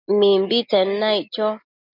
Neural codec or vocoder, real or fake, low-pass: none; real; 5.4 kHz